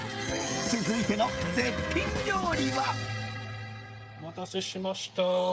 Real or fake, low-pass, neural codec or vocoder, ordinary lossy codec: fake; none; codec, 16 kHz, 16 kbps, FreqCodec, smaller model; none